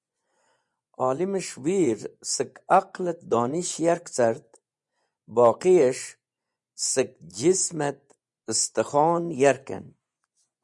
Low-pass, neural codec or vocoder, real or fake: 10.8 kHz; vocoder, 24 kHz, 100 mel bands, Vocos; fake